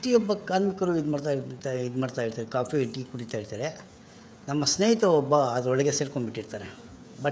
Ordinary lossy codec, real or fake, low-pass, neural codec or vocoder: none; fake; none; codec, 16 kHz, 16 kbps, FreqCodec, smaller model